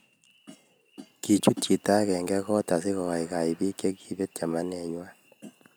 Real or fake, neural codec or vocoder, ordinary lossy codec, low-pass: real; none; none; none